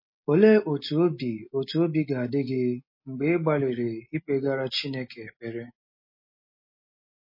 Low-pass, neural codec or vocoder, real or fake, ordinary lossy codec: 5.4 kHz; none; real; MP3, 24 kbps